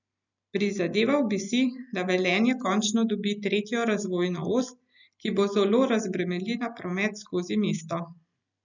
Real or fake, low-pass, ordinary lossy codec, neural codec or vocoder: real; 7.2 kHz; none; none